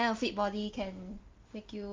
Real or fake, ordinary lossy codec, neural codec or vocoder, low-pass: real; Opus, 24 kbps; none; 7.2 kHz